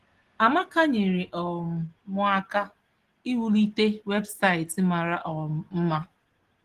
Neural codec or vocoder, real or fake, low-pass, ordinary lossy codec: none; real; 14.4 kHz; Opus, 16 kbps